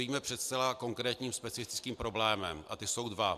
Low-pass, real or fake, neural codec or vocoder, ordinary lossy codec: 14.4 kHz; real; none; MP3, 96 kbps